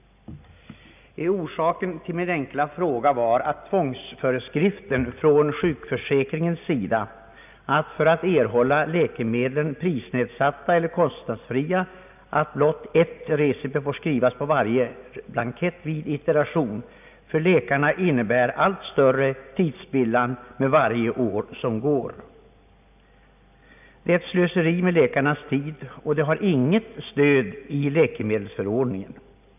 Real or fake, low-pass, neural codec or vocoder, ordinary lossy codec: real; 3.6 kHz; none; none